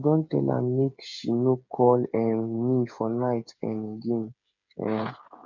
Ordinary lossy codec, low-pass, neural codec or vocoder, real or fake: none; 7.2 kHz; codec, 16 kHz, 8 kbps, FreqCodec, smaller model; fake